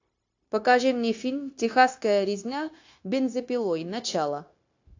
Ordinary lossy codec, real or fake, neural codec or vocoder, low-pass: AAC, 48 kbps; fake; codec, 16 kHz, 0.9 kbps, LongCat-Audio-Codec; 7.2 kHz